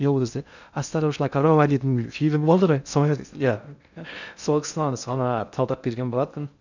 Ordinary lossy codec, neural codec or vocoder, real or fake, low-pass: none; codec, 16 kHz in and 24 kHz out, 0.6 kbps, FocalCodec, streaming, 2048 codes; fake; 7.2 kHz